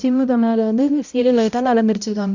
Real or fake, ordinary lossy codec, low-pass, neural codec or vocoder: fake; none; 7.2 kHz; codec, 16 kHz, 0.5 kbps, X-Codec, HuBERT features, trained on balanced general audio